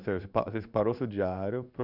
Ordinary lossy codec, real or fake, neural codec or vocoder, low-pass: none; fake; codec, 16 kHz in and 24 kHz out, 1 kbps, XY-Tokenizer; 5.4 kHz